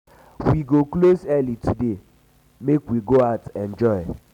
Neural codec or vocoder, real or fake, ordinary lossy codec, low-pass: none; real; none; 19.8 kHz